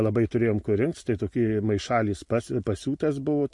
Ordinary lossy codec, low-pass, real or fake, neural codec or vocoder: MP3, 48 kbps; 10.8 kHz; real; none